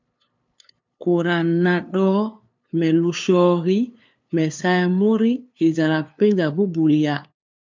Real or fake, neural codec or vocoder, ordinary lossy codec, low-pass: fake; codec, 16 kHz, 2 kbps, FunCodec, trained on LibriTTS, 25 frames a second; MP3, 64 kbps; 7.2 kHz